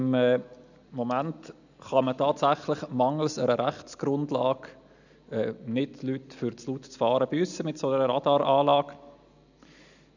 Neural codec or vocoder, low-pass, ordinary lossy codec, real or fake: none; 7.2 kHz; none; real